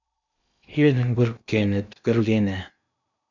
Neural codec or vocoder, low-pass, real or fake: codec, 16 kHz in and 24 kHz out, 0.8 kbps, FocalCodec, streaming, 65536 codes; 7.2 kHz; fake